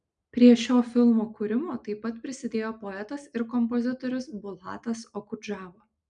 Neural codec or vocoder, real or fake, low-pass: none; real; 9.9 kHz